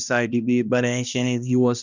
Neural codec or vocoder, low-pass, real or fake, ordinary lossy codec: codec, 16 kHz, 1 kbps, X-Codec, HuBERT features, trained on balanced general audio; 7.2 kHz; fake; none